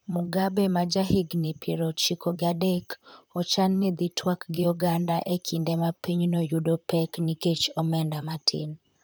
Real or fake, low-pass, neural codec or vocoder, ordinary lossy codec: fake; none; vocoder, 44.1 kHz, 128 mel bands, Pupu-Vocoder; none